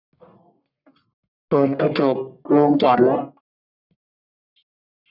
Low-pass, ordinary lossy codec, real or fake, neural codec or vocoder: 5.4 kHz; none; fake; codec, 44.1 kHz, 1.7 kbps, Pupu-Codec